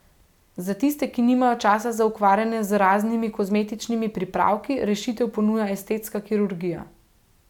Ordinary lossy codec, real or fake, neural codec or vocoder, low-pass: none; real; none; 19.8 kHz